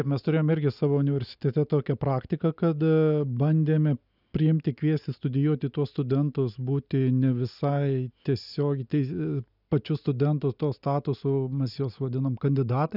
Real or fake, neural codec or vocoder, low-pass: real; none; 5.4 kHz